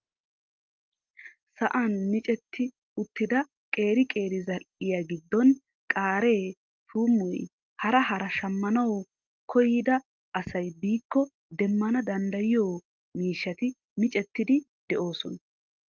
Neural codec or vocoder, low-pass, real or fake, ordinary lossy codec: none; 7.2 kHz; real; Opus, 32 kbps